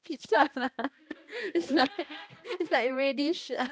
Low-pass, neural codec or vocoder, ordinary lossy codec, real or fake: none; codec, 16 kHz, 1 kbps, X-Codec, HuBERT features, trained on balanced general audio; none; fake